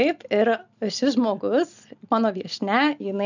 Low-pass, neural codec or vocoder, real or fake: 7.2 kHz; none; real